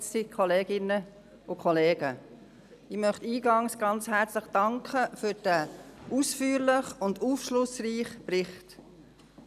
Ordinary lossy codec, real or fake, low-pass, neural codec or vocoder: none; real; 14.4 kHz; none